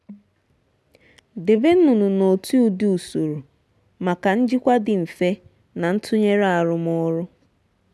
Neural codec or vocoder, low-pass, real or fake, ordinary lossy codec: none; none; real; none